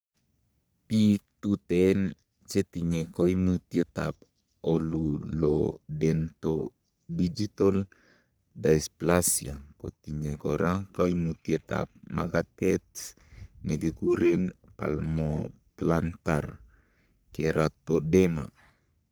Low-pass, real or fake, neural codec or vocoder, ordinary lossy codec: none; fake; codec, 44.1 kHz, 3.4 kbps, Pupu-Codec; none